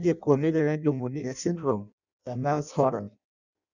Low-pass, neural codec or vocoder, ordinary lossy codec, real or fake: 7.2 kHz; codec, 16 kHz in and 24 kHz out, 0.6 kbps, FireRedTTS-2 codec; none; fake